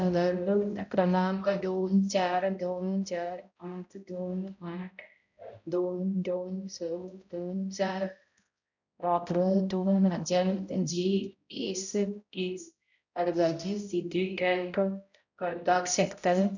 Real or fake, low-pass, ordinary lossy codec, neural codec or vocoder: fake; 7.2 kHz; none; codec, 16 kHz, 0.5 kbps, X-Codec, HuBERT features, trained on balanced general audio